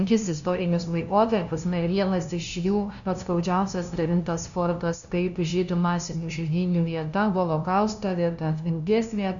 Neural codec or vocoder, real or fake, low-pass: codec, 16 kHz, 0.5 kbps, FunCodec, trained on LibriTTS, 25 frames a second; fake; 7.2 kHz